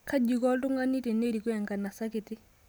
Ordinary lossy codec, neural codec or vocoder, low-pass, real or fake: none; none; none; real